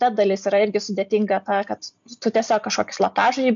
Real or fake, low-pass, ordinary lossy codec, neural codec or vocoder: real; 7.2 kHz; MP3, 64 kbps; none